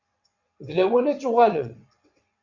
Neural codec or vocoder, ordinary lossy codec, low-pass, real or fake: vocoder, 22.05 kHz, 80 mel bands, WaveNeXt; MP3, 64 kbps; 7.2 kHz; fake